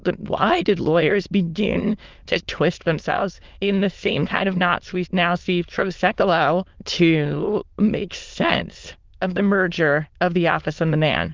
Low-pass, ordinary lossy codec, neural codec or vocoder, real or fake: 7.2 kHz; Opus, 32 kbps; autoencoder, 22.05 kHz, a latent of 192 numbers a frame, VITS, trained on many speakers; fake